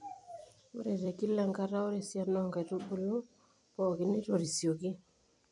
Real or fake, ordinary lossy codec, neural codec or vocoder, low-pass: fake; none; vocoder, 44.1 kHz, 128 mel bands every 256 samples, BigVGAN v2; 10.8 kHz